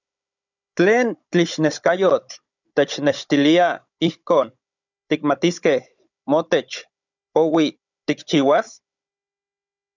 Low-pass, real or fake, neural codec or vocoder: 7.2 kHz; fake; codec, 16 kHz, 16 kbps, FunCodec, trained on Chinese and English, 50 frames a second